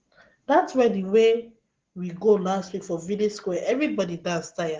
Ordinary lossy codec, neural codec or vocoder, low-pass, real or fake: Opus, 16 kbps; none; 7.2 kHz; real